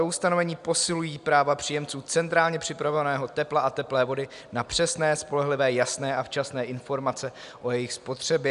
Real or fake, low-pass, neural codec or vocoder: real; 10.8 kHz; none